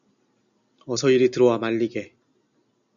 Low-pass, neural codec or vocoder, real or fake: 7.2 kHz; none; real